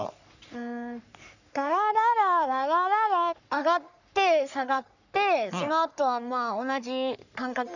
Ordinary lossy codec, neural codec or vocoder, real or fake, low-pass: none; codec, 44.1 kHz, 3.4 kbps, Pupu-Codec; fake; 7.2 kHz